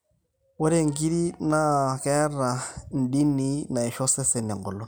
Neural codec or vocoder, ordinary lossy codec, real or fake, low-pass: none; none; real; none